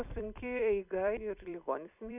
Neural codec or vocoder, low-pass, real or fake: vocoder, 44.1 kHz, 128 mel bands every 256 samples, BigVGAN v2; 3.6 kHz; fake